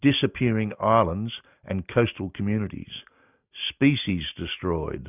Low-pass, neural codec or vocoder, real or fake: 3.6 kHz; none; real